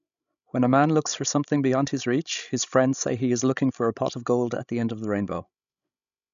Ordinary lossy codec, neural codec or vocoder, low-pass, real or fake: none; codec, 16 kHz, 16 kbps, FreqCodec, larger model; 7.2 kHz; fake